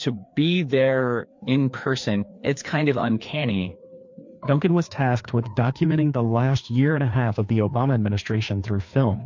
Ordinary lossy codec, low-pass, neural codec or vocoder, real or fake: MP3, 48 kbps; 7.2 kHz; codec, 16 kHz, 2 kbps, FreqCodec, larger model; fake